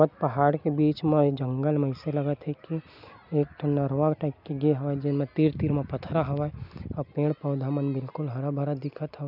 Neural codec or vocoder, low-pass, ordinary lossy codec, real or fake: none; 5.4 kHz; none; real